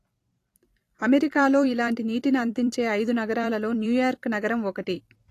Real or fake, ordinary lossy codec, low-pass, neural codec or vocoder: fake; AAC, 48 kbps; 14.4 kHz; vocoder, 44.1 kHz, 128 mel bands every 256 samples, BigVGAN v2